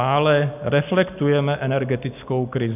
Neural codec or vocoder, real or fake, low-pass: none; real; 3.6 kHz